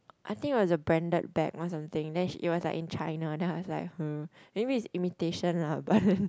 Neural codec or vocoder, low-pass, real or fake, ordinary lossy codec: none; none; real; none